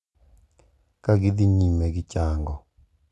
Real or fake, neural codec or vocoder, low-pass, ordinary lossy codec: real; none; none; none